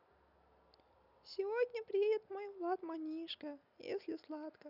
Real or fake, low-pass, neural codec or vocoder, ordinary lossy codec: real; 5.4 kHz; none; none